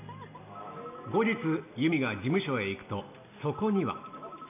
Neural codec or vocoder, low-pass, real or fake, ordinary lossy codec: none; 3.6 kHz; real; none